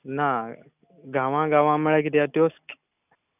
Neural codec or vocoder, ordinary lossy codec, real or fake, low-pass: none; none; real; 3.6 kHz